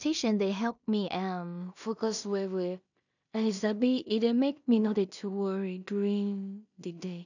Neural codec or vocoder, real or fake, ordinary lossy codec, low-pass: codec, 16 kHz in and 24 kHz out, 0.4 kbps, LongCat-Audio-Codec, two codebook decoder; fake; none; 7.2 kHz